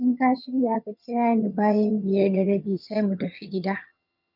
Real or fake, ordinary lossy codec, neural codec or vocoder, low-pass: fake; none; vocoder, 22.05 kHz, 80 mel bands, HiFi-GAN; 5.4 kHz